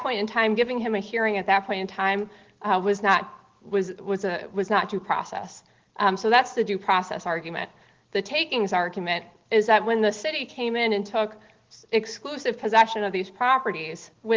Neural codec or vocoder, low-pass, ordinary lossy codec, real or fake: none; 7.2 kHz; Opus, 16 kbps; real